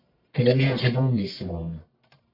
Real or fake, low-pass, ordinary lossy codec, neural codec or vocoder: fake; 5.4 kHz; MP3, 32 kbps; codec, 44.1 kHz, 1.7 kbps, Pupu-Codec